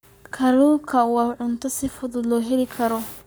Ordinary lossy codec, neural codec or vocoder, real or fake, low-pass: none; codec, 44.1 kHz, 7.8 kbps, Pupu-Codec; fake; none